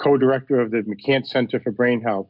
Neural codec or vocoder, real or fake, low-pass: none; real; 5.4 kHz